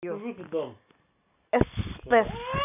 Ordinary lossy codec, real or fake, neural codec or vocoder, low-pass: none; real; none; 3.6 kHz